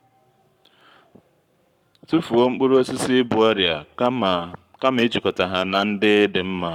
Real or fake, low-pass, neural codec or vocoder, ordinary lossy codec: fake; 19.8 kHz; codec, 44.1 kHz, 7.8 kbps, Pupu-Codec; none